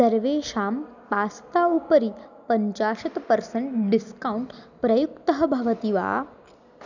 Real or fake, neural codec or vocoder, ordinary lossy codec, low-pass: real; none; none; 7.2 kHz